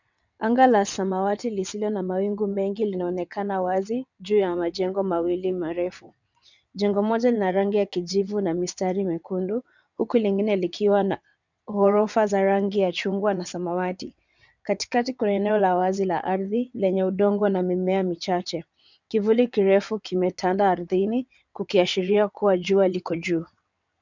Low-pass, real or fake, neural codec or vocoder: 7.2 kHz; fake; vocoder, 22.05 kHz, 80 mel bands, WaveNeXt